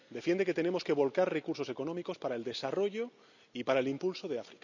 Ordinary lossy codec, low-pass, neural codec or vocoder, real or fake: none; 7.2 kHz; none; real